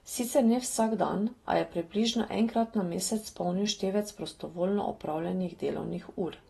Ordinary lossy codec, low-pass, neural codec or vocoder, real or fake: AAC, 32 kbps; 19.8 kHz; none; real